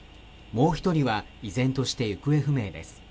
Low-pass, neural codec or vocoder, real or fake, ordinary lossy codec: none; none; real; none